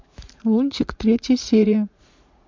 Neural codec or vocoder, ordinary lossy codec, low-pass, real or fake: vocoder, 44.1 kHz, 80 mel bands, Vocos; MP3, 64 kbps; 7.2 kHz; fake